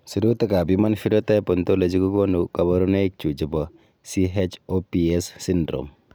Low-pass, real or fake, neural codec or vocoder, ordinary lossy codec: none; real; none; none